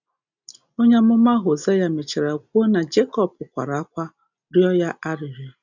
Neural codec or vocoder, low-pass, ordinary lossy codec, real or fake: none; 7.2 kHz; none; real